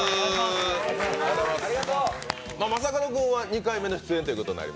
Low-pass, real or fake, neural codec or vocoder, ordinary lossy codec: none; real; none; none